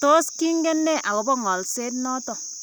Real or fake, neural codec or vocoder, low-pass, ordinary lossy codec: real; none; none; none